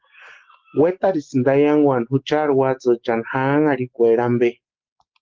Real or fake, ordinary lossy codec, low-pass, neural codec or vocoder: real; Opus, 16 kbps; 7.2 kHz; none